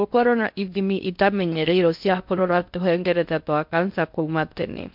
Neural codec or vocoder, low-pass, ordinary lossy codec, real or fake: codec, 16 kHz in and 24 kHz out, 0.6 kbps, FocalCodec, streaming, 2048 codes; 5.4 kHz; MP3, 48 kbps; fake